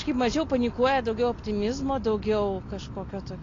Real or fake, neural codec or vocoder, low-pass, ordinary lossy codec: real; none; 7.2 kHz; AAC, 32 kbps